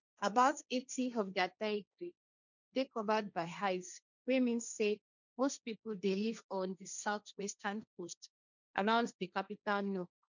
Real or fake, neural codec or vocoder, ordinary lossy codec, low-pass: fake; codec, 16 kHz, 1.1 kbps, Voila-Tokenizer; none; 7.2 kHz